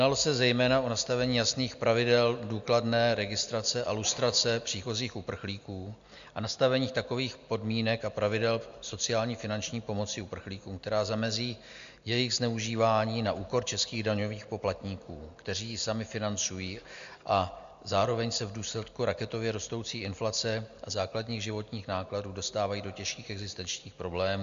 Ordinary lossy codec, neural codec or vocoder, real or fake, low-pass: MP3, 64 kbps; none; real; 7.2 kHz